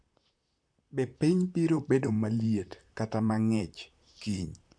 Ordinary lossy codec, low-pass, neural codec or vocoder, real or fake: none; 9.9 kHz; vocoder, 44.1 kHz, 128 mel bands, Pupu-Vocoder; fake